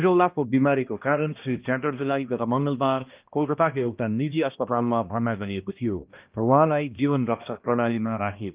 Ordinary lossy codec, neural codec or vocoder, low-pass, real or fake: Opus, 32 kbps; codec, 16 kHz, 1 kbps, X-Codec, HuBERT features, trained on balanced general audio; 3.6 kHz; fake